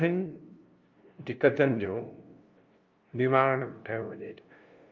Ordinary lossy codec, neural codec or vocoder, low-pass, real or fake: Opus, 32 kbps; codec, 16 kHz, 0.5 kbps, FunCodec, trained on LibriTTS, 25 frames a second; 7.2 kHz; fake